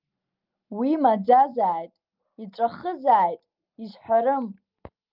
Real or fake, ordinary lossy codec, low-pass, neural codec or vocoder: real; Opus, 24 kbps; 5.4 kHz; none